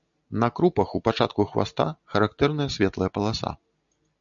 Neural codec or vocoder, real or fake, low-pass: none; real; 7.2 kHz